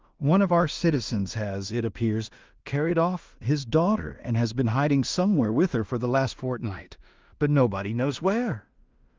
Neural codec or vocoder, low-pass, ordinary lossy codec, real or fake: codec, 16 kHz in and 24 kHz out, 0.4 kbps, LongCat-Audio-Codec, two codebook decoder; 7.2 kHz; Opus, 24 kbps; fake